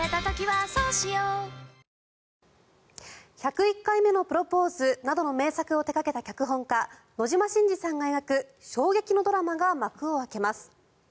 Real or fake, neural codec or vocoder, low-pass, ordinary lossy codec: real; none; none; none